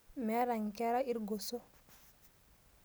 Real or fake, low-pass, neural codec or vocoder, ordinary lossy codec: real; none; none; none